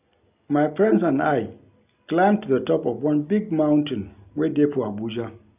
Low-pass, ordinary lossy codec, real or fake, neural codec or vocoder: 3.6 kHz; none; real; none